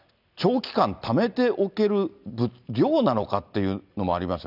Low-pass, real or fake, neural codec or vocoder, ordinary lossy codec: 5.4 kHz; real; none; none